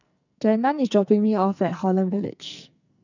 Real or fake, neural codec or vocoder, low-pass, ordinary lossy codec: fake; codec, 44.1 kHz, 2.6 kbps, SNAC; 7.2 kHz; none